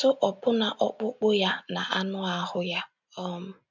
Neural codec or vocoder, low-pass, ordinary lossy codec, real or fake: none; 7.2 kHz; none; real